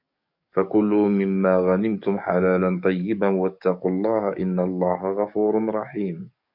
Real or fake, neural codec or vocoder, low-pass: fake; codec, 44.1 kHz, 7.8 kbps, DAC; 5.4 kHz